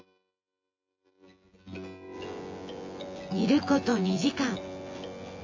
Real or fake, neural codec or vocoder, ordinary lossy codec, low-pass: fake; vocoder, 24 kHz, 100 mel bands, Vocos; AAC, 48 kbps; 7.2 kHz